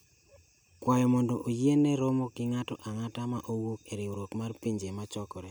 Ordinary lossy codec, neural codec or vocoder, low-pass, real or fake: none; none; none; real